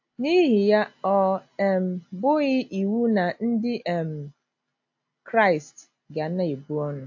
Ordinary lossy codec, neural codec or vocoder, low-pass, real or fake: AAC, 48 kbps; none; 7.2 kHz; real